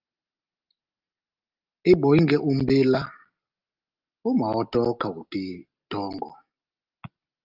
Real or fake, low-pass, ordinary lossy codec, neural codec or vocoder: real; 5.4 kHz; Opus, 32 kbps; none